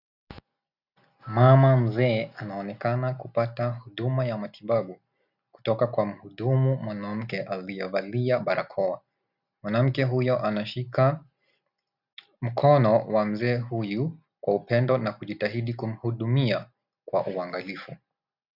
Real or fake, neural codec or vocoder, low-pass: real; none; 5.4 kHz